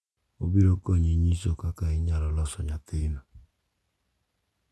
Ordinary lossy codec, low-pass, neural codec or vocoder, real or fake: none; none; none; real